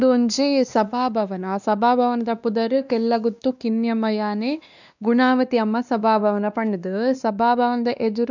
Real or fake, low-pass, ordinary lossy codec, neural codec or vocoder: fake; 7.2 kHz; none; codec, 16 kHz, 2 kbps, X-Codec, WavLM features, trained on Multilingual LibriSpeech